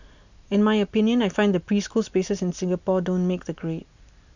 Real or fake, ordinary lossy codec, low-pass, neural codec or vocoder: real; none; 7.2 kHz; none